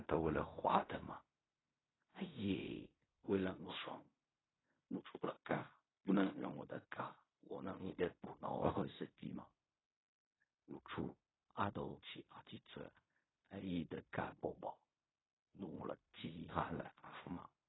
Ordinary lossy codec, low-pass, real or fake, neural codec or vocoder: AAC, 16 kbps; 7.2 kHz; fake; codec, 16 kHz in and 24 kHz out, 0.4 kbps, LongCat-Audio-Codec, fine tuned four codebook decoder